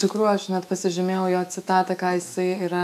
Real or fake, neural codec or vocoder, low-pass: fake; autoencoder, 48 kHz, 128 numbers a frame, DAC-VAE, trained on Japanese speech; 14.4 kHz